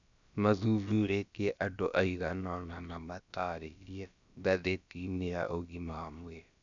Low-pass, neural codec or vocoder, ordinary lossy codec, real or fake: 7.2 kHz; codec, 16 kHz, about 1 kbps, DyCAST, with the encoder's durations; none; fake